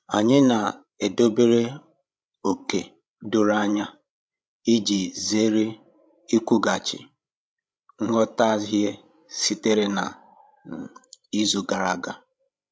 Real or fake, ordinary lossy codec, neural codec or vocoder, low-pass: fake; none; codec, 16 kHz, 16 kbps, FreqCodec, larger model; none